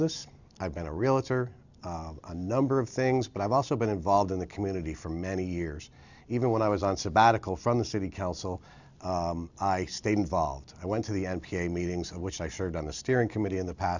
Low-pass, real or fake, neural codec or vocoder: 7.2 kHz; real; none